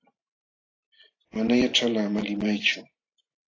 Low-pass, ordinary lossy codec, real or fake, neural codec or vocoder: 7.2 kHz; AAC, 32 kbps; fake; vocoder, 44.1 kHz, 128 mel bands every 512 samples, BigVGAN v2